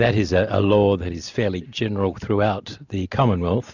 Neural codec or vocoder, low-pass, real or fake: none; 7.2 kHz; real